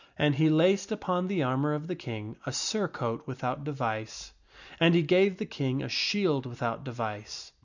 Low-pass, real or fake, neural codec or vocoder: 7.2 kHz; real; none